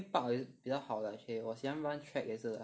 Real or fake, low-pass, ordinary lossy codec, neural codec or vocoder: real; none; none; none